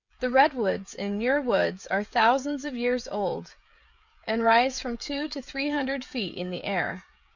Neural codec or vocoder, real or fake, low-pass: codec, 16 kHz, 16 kbps, FreqCodec, smaller model; fake; 7.2 kHz